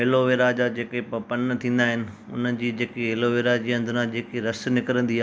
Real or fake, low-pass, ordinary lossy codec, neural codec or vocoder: real; none; none; none